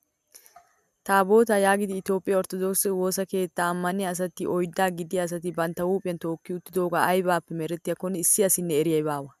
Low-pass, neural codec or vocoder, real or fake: 14.4 kHz; none; real